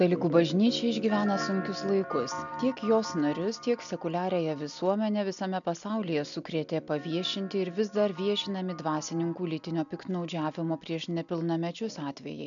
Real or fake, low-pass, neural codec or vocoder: real; 7.2 kHz; none